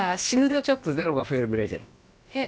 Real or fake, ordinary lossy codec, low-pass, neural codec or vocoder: fake; none; none; codec, 16 kHz, about 1 kbps, DyCAST, with the encoder's durations